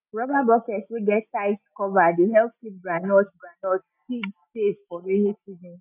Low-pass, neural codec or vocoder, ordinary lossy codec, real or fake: 3.6 kHz; none; none; real